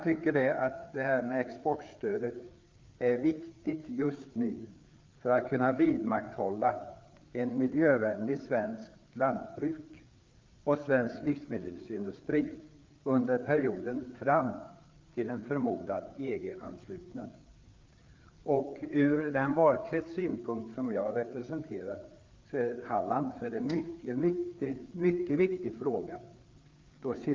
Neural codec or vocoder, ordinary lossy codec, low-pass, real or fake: codec, 16 kHz, 4 kbps, FreqCodec, larger model; Opus, 24 kbps; 7.2 kHz; fake